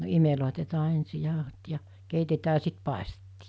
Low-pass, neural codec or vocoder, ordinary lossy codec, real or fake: none; none; none; real